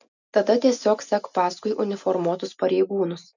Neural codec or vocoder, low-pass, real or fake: none; 7.2 kHz; real